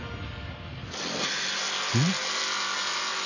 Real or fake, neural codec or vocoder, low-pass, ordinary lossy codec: real; none; 7.2 kHz; none